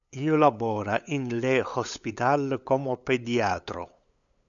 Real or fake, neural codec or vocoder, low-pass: fake; codec, 16 kHz, 8 kbps, FunCodec, trained on LibriTTS, 25 frames a second; 7.2 kHz